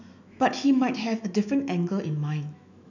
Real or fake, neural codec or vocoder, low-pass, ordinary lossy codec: fake; autoencoder, 48 kHz, 128 numbers a frame, DAC-VAE, trained on Japanese speech; 7.2 kHz; none